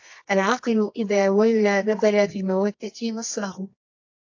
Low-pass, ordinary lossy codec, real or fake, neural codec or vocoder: 7.2 kHz; AAC, 48 kbps; fake; codec, 24 kHz, 0.9 kbps, WavTokenizer, medium music audio release